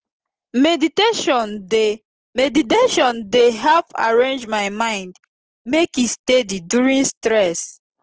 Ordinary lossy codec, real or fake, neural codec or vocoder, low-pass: Opus, 16 kbps; real; none; 7.2 kHz